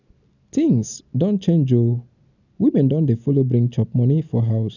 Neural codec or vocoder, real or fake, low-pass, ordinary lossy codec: none; real; 7.2 kHz; none